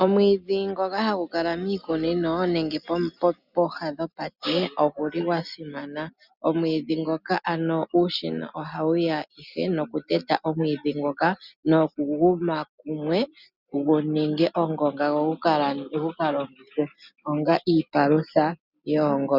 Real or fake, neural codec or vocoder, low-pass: real; none; 5.4 kHz